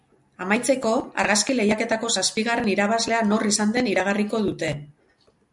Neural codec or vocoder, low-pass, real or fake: none; 10.8 kHz; real